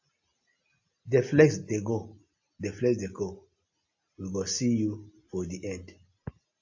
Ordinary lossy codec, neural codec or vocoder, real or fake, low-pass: AAC, 48 kbps; none; real; 7.2 kHz